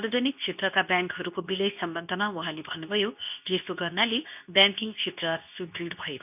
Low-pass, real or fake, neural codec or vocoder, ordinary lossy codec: 3.6 kHz; fake; codec, 24 kHz, 0.9 kbps, WavTokenizer, medium speech release version 2; none